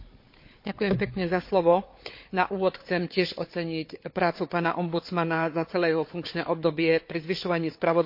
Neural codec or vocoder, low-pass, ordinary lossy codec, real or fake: codec, 16 kHz, 4 kbps, FunCodec, trained on Chinese and English, 50 frames a second; 5.4 kHz; MP3, 32 kbps; fake